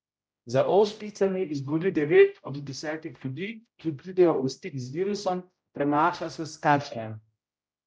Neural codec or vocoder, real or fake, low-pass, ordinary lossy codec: codec, 16 kHz, 0.5 kbps, X-Codec, HuBERT features, trained on general audio; fake; none; none